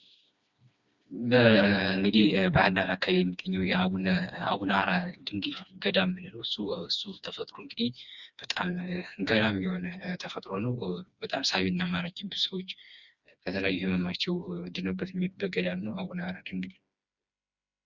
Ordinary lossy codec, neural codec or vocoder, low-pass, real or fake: Opus, 64 kbps; codec, 16 kHz, 2 kbps, FreqCodec, smaller model; 7.2 kHz; fake